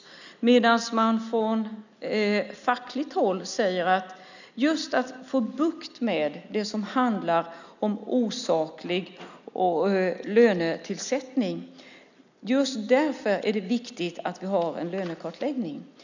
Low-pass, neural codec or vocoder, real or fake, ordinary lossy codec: 7.2 kHz; none; real; none